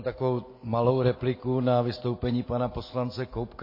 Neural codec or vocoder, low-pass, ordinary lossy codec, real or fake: none; 5.4 kHz; MP3, 24 kbps; real